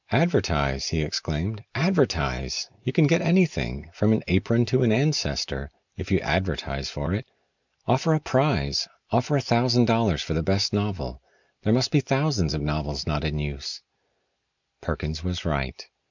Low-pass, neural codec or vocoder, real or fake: 7.2 kHz; none; real